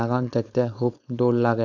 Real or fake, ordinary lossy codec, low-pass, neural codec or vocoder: fake; none; 7.2 kHz; codec, 16 kHz, 4.8 kbps, FACodec